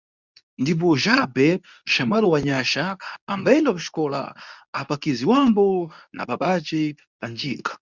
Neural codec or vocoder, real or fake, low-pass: codec, 24 kHz, 0.9 kbps, WavTokenizer, medium speech release version 2; fake; 7.2 kHz